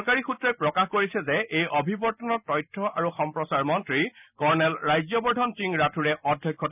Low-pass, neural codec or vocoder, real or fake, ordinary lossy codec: 3.6 kHz; none; real; none